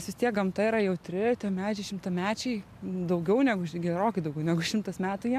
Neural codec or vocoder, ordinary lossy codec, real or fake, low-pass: none; AAC, 96 kbps; real; 14.4 kHz